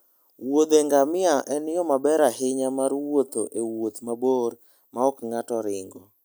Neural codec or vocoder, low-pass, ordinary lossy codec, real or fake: none; none; none; real